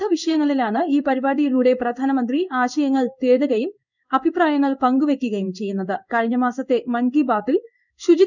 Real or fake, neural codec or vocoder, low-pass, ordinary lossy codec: fake; codec, 16 kHz in and 24 kHz out, 1 kbps, XY-Tokenizer; 7.2 kHz; none